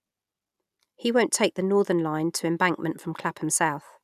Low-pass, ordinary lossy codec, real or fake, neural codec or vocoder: 14.4 kHz; none; real; none